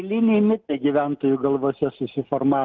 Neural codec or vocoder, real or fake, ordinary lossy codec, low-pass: none; real; Opus, 32 kbps; 7.2 kHz